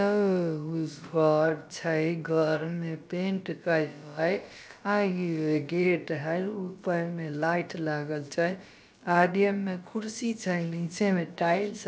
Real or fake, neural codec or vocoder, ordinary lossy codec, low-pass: fake; codec, 16 kHz, about 1 kbps, DyCAST, with the encoder's durations; none; none